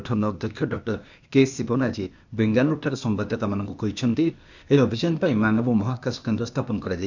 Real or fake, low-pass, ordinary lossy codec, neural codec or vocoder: fake; 7.2 kHz; none; codec, 16 kHz, 0.8 kbps, ZipCodec